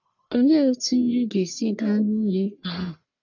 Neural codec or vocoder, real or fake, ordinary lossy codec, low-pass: codec, 44.1 kHz, 1.7 kbps, Pupu-Codec; fake; none; 7.2 kHz